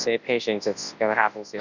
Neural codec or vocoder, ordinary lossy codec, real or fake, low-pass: codec, 24 kHz, 0.9 kbps, WavTokenizer, large speech release; Opus, 64 kbps; fake; 7.2 kHz